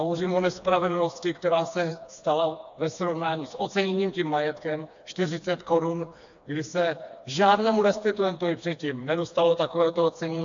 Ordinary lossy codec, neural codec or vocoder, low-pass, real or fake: AAC, 64 kbps; codec, 16 kHz, 2 kbps, FreqCodec, smaller model; 7.2 kHz; fake